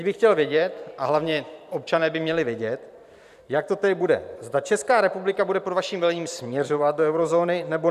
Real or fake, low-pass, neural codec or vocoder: fake; 14.4 kHz; vocoder, 44.1 kHz, 128 mel bands every 512 samples, BigVGAN v2